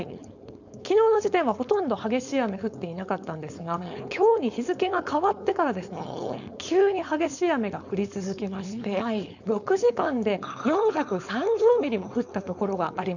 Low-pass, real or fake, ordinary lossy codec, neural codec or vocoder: 7.2 kHz; fake; none; codec, 16 kHz, 4.8 kbps, FACodec